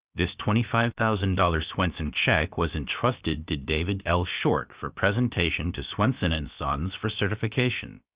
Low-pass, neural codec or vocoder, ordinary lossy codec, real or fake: 3.6 kHz; codec, 16 kHz, about 1 kbps, DyCAST, with the encoder's durations; Opus, 64 kbps; fake